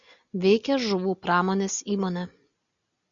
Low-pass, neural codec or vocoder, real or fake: 7.2 kHz; none; real